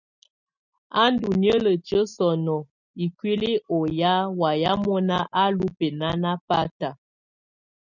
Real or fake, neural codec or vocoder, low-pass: real; none; 7.2 kHz